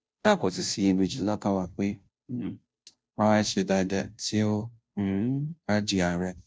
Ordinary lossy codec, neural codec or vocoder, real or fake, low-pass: none; codec, 16 kHz, 0.5 kbps, FunCodec, trained on Chinese and English, 25 frames a second; fake; none